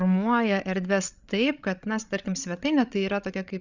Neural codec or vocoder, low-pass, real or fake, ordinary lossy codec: codec, 16 kHz, 16 kbps, FreqCodec, larger model; 7.2 kHz; fake; Opus, 64 kbps